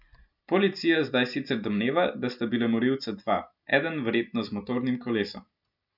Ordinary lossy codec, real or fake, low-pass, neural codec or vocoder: none; real; 5.4 kHz; none